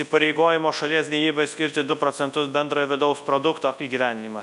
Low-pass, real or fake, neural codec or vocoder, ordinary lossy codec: 10.8 kHz; fake; codec, 24 kHz, 0.9 kbps, WavTokenizer, large speech release; MP3, 96 kbps